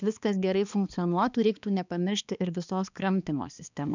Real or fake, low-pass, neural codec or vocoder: fake; 7.2 kHz; codec, 16 kHz, 2 kbps, X-Codec, HuBERT features, trained on balanced general audio